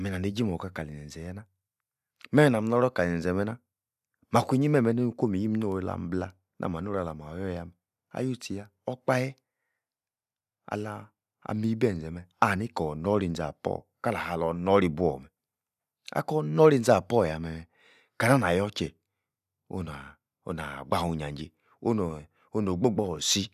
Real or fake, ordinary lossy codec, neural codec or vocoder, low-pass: real; none; none; 14.4 kHz